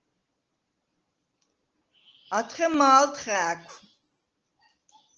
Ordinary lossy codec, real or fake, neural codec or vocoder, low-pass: Opus, 16 kbps; real; none; 7.2 kHz